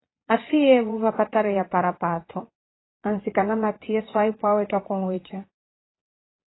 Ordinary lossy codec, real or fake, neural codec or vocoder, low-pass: AAC, 16 kbps; fake; vocoder, 22.05 kHz, 80 mel bands, Vocos; 7.2 kHz